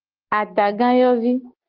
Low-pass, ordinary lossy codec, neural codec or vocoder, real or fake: 5.4 kHz; Opus, 16 kbps; none; real